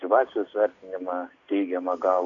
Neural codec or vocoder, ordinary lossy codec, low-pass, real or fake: none; AAC, 48 kbps; 7.2 kHz; real